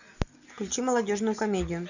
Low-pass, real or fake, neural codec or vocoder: 7.2 kHz; real; none